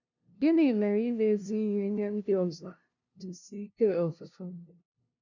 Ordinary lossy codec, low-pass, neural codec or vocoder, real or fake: none; 7.2 kHz; codec, 16 kHz, 0.5 kbps, FunCodec, trained on LibriTTS, 25 frames a second; fake